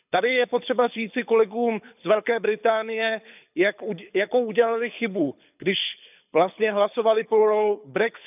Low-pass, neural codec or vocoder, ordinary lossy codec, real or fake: 3.6 kHz; codec, 24 kHz, 6 kbps, HILCodec; none; fake